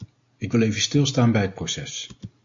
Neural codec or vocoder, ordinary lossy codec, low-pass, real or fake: none; MP3, 48 kbps; 7.2 kHz; real